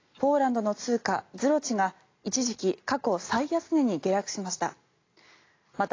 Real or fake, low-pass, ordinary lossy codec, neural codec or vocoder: real; 7.2 kHz; AAC, 32 kbps; none